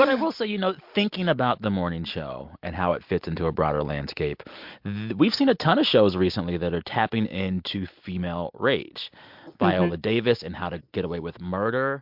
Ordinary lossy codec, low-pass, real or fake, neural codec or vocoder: MP3, 48 kbps; 5.4 kHz; real; none